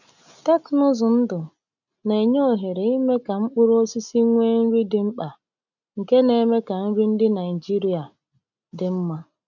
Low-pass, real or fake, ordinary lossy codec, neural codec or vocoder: 7.2 kHz; real; none; none